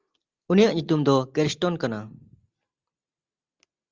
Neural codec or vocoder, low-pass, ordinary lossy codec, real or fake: none; 7.2 kHz; Opus, 32 kbps; real